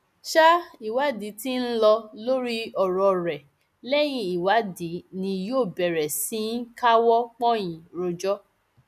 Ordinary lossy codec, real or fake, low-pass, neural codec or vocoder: none; real; 14.4 kHz; none